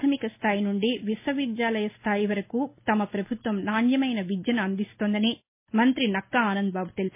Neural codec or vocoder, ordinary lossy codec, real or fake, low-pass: none; MP3, 24 kbps; real; 3.6 kHz